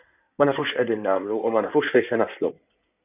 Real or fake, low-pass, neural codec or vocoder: fake; 3.6 kHz; codec, 16 kHz in and 24 kHz out, 2.2 kbps, FireRedTTS-2 codec